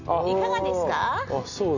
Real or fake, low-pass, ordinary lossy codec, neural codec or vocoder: real; 7.2 kHz; none; none